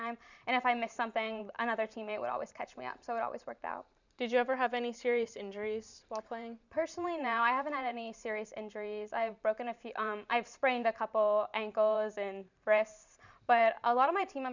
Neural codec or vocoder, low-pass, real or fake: vocoder, 44.1 kHz, 128 mel bands every 512 samples, BigVGAN v2; 7.2 kHz; fake